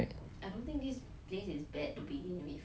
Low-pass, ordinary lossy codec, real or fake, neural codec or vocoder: none; none; real; none